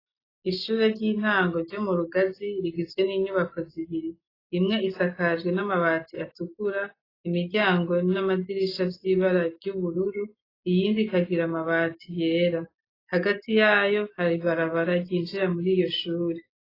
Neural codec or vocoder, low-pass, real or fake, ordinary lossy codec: none; 5.4 kHz; real; AAC, 24 kbps